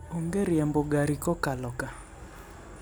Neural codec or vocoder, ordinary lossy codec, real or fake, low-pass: none; none; real; none